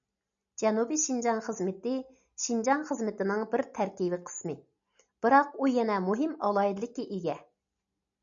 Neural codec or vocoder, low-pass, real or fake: none; 7.2 kHz; real